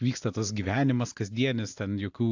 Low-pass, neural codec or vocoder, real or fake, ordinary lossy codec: 7.2 kHz; none; real; AAC, 48 kbps